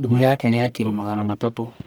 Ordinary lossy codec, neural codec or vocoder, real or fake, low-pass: none; codec, 44.1 kHz, 1.7 kbps, Pupu-Codec; fake; none